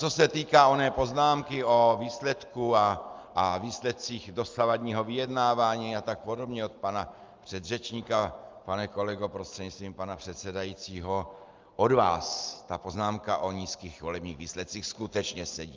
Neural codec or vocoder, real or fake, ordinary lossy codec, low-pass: none; real; Opus, 24 kbps; 7.2 kHz